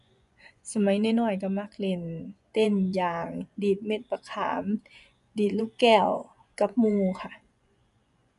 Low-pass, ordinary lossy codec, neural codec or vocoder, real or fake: 10.8 kHz; MP3, 96 kbps; vocoder, 24 kHz, 100 mel bands, Vocos; fake